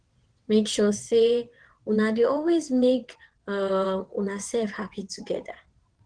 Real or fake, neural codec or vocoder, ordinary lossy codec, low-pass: fake; vocoder, 22.05 kHz, 80 mel bands, WaveNeXt; Opus, 16 kbps; 9.9 kHz